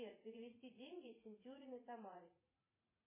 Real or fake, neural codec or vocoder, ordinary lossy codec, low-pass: fake; vocoder, 44.1 kHz, 80 mel bands, Vocos; MP3, 16 kbps; 3.6 kHz